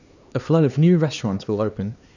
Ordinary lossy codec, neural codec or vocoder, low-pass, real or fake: none; codec, 16 kHz, 2 kbps, X-Codec, HuBERT features, trained on LibriSpeech; 7.2 kHz; fake